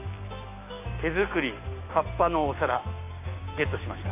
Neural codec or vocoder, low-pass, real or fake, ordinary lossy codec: codec, 16 kHz, 6 kbps, DAC; 3.6 kHz; fake; MP3, 24 kbps